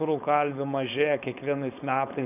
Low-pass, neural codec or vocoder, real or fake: 3.6 kHz; codec, 16 kHz, 4.8 kbps, FACodec; fake